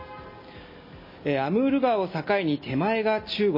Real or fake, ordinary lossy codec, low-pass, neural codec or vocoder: real; MP3, 24 kbps; 5.4 kHz; none